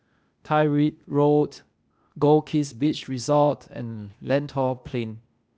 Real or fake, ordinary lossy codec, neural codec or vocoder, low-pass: fake; none; codec, 16 kHz, 0.8 kbps, ZipCodec; none